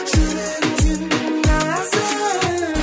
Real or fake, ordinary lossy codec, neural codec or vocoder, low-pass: real; none; none; none